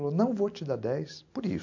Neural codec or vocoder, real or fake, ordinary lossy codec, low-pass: none; real; MP3, 64 kbps; 7.2 kHz